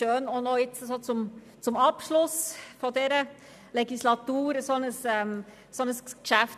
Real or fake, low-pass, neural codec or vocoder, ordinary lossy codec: real; 14.4 kHz; none; none